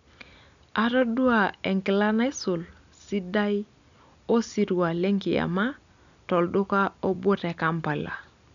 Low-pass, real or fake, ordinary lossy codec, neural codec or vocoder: 7.2 kHz; real; none; none